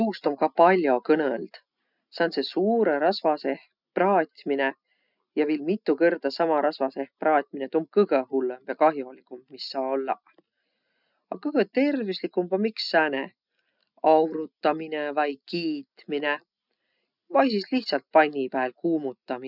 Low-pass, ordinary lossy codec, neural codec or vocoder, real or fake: 5.4 kHz; none; none; real